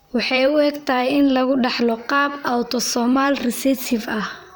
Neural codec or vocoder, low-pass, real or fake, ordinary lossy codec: vocoder, 44.1 kHz, 128 mel bands, Pupu-Vocoder; none; fake; none